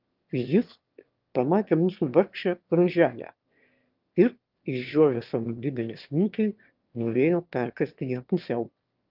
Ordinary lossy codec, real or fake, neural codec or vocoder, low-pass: Opus, 24 kbps; fake; autoencoder, 22.05 kHz, a latent of 192 numbers a frame, VITS, trained on one speaker; 5.4 kHz